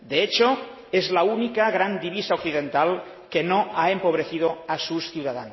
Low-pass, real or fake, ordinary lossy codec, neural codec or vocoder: 7.2 kHz; real; MP3, 24 kbps; none